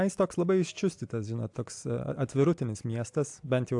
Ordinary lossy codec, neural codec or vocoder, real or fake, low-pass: AAC, 64 kbps; none; real; 10.8 kHz